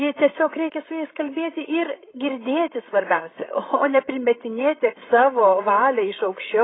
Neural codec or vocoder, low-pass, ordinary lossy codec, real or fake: codec, 16 kHz, 16 kbps, FreqCodec, larger model; 7.2 kHz; AAC, 16 kbps; fake